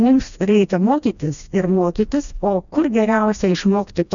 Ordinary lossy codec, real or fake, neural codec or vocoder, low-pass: MP3, 96 kbps; fake; codec, 16 kHz, 1 kbps, FreqCodec, smaller model; 7.2 kHz